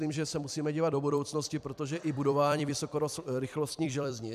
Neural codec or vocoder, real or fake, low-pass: vocoder, 44.1 kHz, 128 mel bands every 256 samples, BigVGAN v2; fake; 14.4 kHz